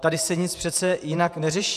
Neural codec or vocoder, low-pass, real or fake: vocoder, 48 kHz, 128 mel bands, Vocos; 14.4 kHz; fake